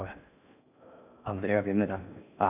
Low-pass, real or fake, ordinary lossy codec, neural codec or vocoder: 3.6 kHz; fake; none; codec, 16 kHz in and 24 kHz out, 0.6 kbps, FocalCodec, streaming, 2048 codes